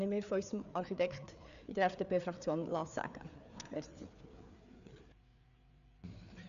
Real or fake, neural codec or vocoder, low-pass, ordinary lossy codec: fake; codec, 16 kHz, 4 kbps, FreqCodec, larger model; 7.2 kHz; MP3, 96 kbps